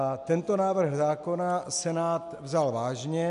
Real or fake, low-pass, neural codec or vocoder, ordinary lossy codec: real; 10.8 kHz; none; MP3, 64 kbps